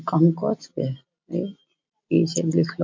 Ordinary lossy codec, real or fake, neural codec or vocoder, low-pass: MP3, 48 kbps; real; none; 7.2 kHz